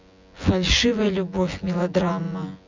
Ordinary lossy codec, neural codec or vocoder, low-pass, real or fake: MP3, 64 kbps; vocoder, 24 kHz, 100 mel bands, Vocos; 7.2 kHz; fake